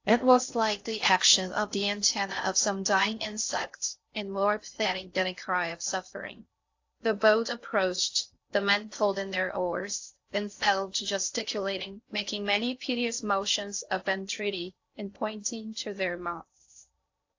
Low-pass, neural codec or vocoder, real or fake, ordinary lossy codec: 7.2 kHz; codec, 16 kHz in and 24 kHz out, 0.6 kbps, FocalCodec, streaming, 2048 codes; fake; AAC, 48 kbps